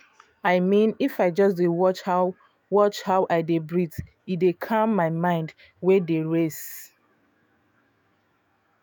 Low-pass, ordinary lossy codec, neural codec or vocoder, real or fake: none; none; autoencoder, 48 kHz, 128 numbers a frame, DAC-VAE, trained on Japanese speech; fake